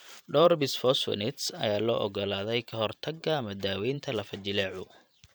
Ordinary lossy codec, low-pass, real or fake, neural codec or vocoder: none; none; fake; vocoder, 44.1 kHz, 128 mel bands every 512 samples, BigVGAN v2